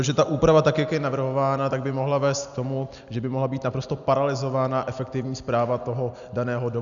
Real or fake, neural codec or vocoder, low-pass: real; none; 7.2 kHz